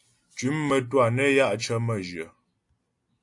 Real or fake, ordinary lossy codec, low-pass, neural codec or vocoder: real; AAC, 64 kbps; 10.8 kHz; none